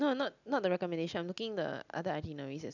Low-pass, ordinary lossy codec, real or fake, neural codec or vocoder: 7.2 kHz; none; real; none